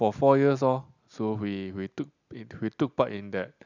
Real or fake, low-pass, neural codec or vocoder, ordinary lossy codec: real; 7.2 kHz; none; none